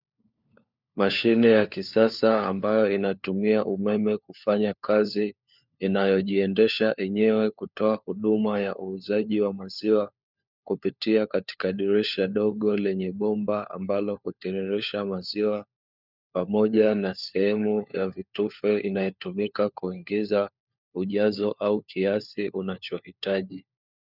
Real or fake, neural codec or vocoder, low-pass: fake; codec, 16 kHz, 4 kbps, FunCodec, trained on LibriTTS, 50 frames a second; 5.4 kHz